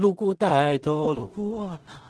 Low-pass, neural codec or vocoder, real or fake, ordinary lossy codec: 10.8 kHz; codec, 16 kHz in and 24 kHz out, 0.4 kbps, LongCat-Audio-Codec, two codebook decoder; fake; Opus, 16 kbps